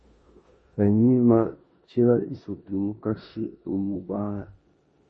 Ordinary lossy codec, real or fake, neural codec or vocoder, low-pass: MP3, 32 kbps; fake; codec, 16 kHz in and 24 kHz out, 0.9 kbps, LongCat-Audio-Codec, four codebook decoder; 10.8 kHz